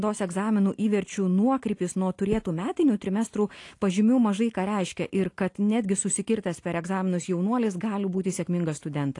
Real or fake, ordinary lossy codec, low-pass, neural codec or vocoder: real; AAC, 48 kbps; 10.8 kHz; none